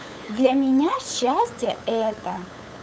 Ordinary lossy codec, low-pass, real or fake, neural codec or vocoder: none; none; fake; codec, 16 kHz, 8 kbps, FunCodec, trained on LibriTTS, 25 frames a second